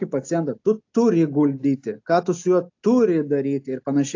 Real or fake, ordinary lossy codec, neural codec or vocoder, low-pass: real; AAC, 48 kbps; none; 7.2 kHz